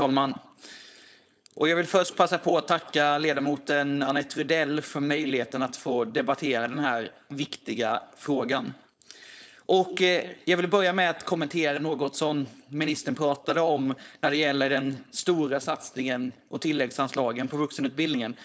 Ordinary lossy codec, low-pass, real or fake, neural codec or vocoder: none; none; fake; codec, 16 kHz, 4.8 kbps, FACodec